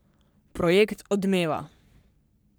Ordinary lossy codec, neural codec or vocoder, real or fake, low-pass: none; codec, 44.1 kHz, 3.4 kbps, Pupu-Codec; fake; none